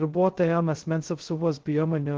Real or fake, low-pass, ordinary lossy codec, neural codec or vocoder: fake; 7.2 kHz; Opus, 16 kbps; codec, 16 kHz, 0.2 kbps, FocalCodec